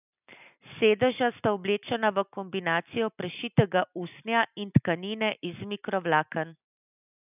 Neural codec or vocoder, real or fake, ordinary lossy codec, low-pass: none; real; none; 3.6 kHz